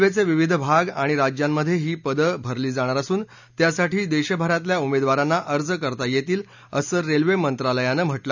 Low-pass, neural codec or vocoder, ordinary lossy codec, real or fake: 7.2 kHz; none; none; real